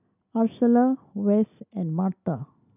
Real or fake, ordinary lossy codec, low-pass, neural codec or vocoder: real; none; 3.6 kHz; none